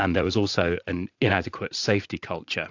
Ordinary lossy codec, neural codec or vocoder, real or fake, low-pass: AAC, 48 kbps; vocoder, 44.1 kHz, 80 mel bands, Vocos; fake; 7.2 kHz